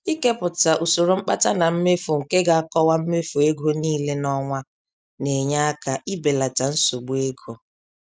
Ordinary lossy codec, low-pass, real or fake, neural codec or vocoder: none; none; real; none